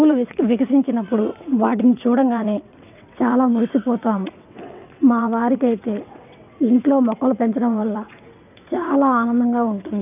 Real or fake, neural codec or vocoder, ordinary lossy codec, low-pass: fake; vocoder, 22.05 kHz, 80 mel bands, WaveNeXt; none; 3.6 kHz